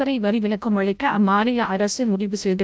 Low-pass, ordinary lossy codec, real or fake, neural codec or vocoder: none; none; fake; codec, 16 kHz, 0.5 kbps, FreqCodec, larger model